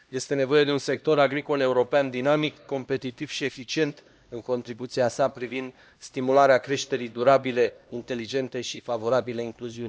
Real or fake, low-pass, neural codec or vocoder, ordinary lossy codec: fake; none; codec, 16 kHz, 1 kbps, X-Codec, HuBERT features, trained on LibriSpeech; none